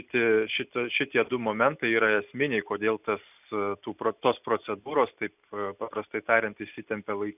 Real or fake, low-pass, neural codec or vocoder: real; 3.6 kHz; none